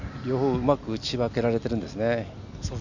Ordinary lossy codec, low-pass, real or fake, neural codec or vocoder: none; 7.2 kHz; real; none